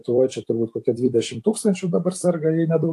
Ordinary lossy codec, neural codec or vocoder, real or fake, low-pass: AAC, 64 kbps; vocoder, 44.1 kHz, 128 mel bands every 256 samples, BigVGAN v2; fake; 14.4 kHz